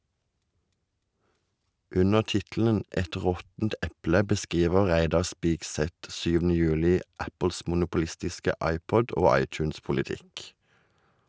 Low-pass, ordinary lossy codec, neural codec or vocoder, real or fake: none; none; none; real